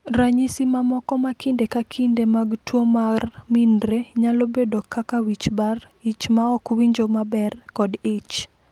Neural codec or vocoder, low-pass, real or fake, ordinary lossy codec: none; 19.8 kHz; real; Opus, 32 kbps